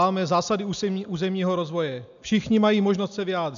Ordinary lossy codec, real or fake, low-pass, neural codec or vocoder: AAC, 96 kbps; real; 7.2 kHz; none